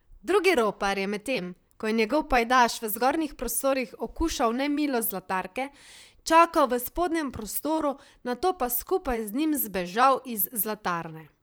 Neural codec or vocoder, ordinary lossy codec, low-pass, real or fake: vocoder, 44.1 kHz, 128 mel bands, Pupu-Vocoder; none; none; fake